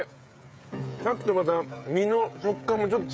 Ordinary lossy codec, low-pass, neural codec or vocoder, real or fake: none; none; codec, 16 kHz, 16 kbps, FreqCodec, smaller model; fake